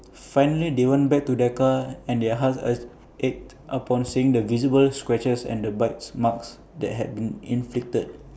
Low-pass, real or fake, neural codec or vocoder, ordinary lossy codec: none; real; none; none